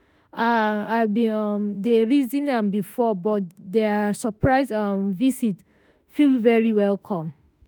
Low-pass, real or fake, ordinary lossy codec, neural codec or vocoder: none; fake; none; autoencoder, 48 kHz, 32 numbers a frame, DAC-VAE, trained on Japanese speech